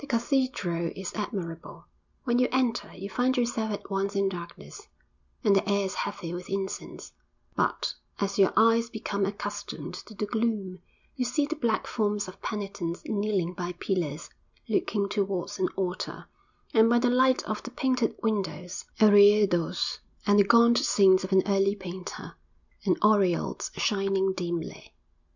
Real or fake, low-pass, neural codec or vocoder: real; 7.2 kHz; none